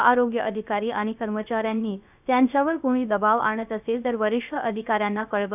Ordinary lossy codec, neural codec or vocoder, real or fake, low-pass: none; codec, 16 kHz, 0.3 kbps, FocalCodec; fake; 3.6 kHz